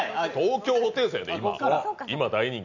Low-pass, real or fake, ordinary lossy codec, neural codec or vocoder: 7.2 kHz; real; none; none